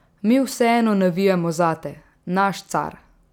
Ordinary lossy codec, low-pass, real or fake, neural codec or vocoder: none; 19.8 kHz; real; none